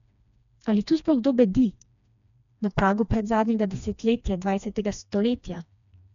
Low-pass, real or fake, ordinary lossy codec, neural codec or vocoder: 7.2 kHz; fake; none; codec, 16 kHz, 2 kbps, FreqCodec, smaller model